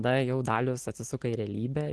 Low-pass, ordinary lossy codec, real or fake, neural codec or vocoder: 10.8 kHz; Opus, 16 kbps; real; none